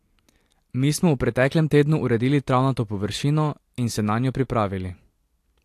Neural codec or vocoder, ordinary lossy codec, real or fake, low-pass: none; AAC, 64 kbps; real; 14.4 kHz